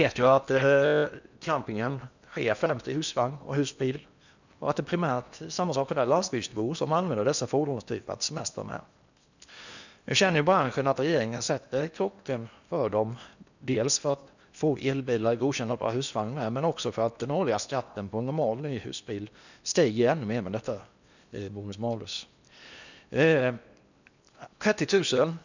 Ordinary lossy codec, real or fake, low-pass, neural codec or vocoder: none; fake; 7.2 kHz; codec, 16 kHz in and 24 kHz out, 0.8 kbps, FocalCodec, streaming, 65536 codes